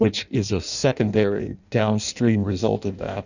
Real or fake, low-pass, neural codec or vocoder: fake; 7.2 kHz; codec, 16 kHz in and 24 kHz out, 0.6 kbps, FireRedTTS-2 codec